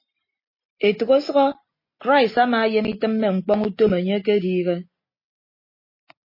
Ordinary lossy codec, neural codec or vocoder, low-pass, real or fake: MP3, 24 kbps; none; 5.4 kHz; real